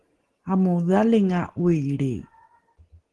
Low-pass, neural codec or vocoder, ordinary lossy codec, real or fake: 10.8 kHz; none; Opus, 16 kbps; real